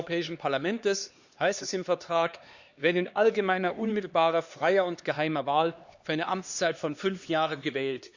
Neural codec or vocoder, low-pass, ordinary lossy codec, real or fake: codec, 16 kHz, 2 kbps, X-Codec, HuBERT features, trained on LibriSpeech; 7.2 kHz; Opus, 64 kbps; fake